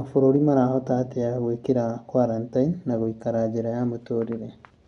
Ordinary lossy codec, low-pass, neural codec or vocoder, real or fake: none; 10.8 kHz; none; real